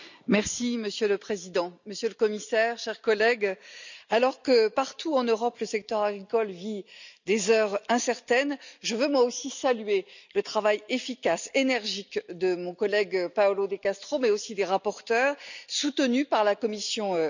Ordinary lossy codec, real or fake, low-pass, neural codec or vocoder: none; real; 7.2 kHz; none